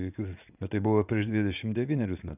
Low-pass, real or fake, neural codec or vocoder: 3.6 kHz; fake; vocoder, 44.1 kHz, 80 mel bands, Vocos